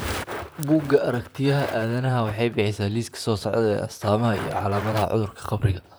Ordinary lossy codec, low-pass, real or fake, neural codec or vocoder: none; none; fake; vocoder, 44.1 kHz, 128 mel bands, Pupu-Vocoder